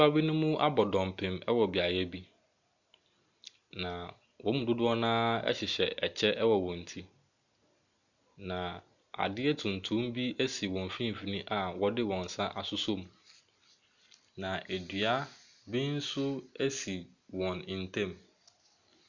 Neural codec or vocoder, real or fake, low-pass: none; real; 7.2 kHz